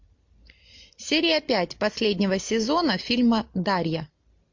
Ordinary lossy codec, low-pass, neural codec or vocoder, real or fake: MP3, 48 kbps; 7.2 kHz; none; real